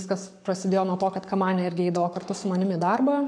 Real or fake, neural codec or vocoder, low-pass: fake; codec, 44.1 kHz, 7.8 kbps, Pupu-Codec; 9.9 kHz